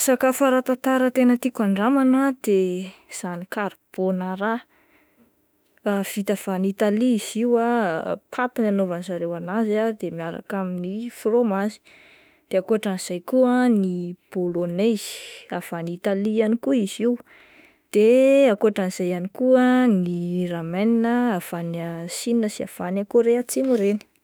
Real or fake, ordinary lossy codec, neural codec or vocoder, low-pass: fake; none; autoencoder, 48 kHz, 32 numbers a frame, DAC-VAE, trained on Japanese speech; none